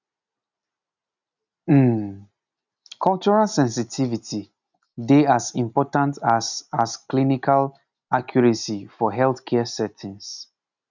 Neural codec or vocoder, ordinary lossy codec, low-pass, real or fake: none; none; 7.2 kHz; real